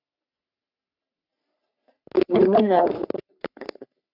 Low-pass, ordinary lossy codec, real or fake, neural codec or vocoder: 5.4 kHz; AAC, 48 kbps; fake; codec, 44.1 kHz, 3.4 kbps, Pupu-Codec